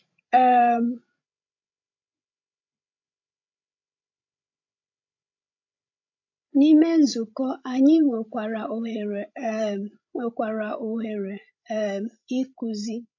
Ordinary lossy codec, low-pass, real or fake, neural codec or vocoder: AAC, 48 kbps; 7.2 kHz; fake; codec, 16 kHz, 16 kbps, FreqCodec, larger model